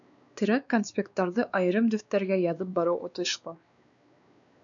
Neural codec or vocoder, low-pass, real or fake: codec, 16 kHz, 2 kbps, X-Codec, WavLM features, trained on Multilingual LibriSpeech; 7.2 kHz; fake